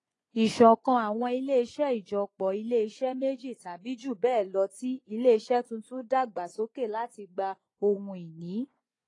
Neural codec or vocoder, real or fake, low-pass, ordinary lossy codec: vocoder, 24 kHz, 100 mel bands, Vocos; fake; 10.8 kHz; AAC, 32 kbps